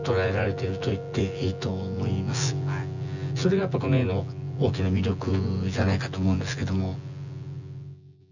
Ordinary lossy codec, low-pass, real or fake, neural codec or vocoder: none; 7.2 kHz; fake; vocoder, 24 kHz, 100 mel bands, Vocos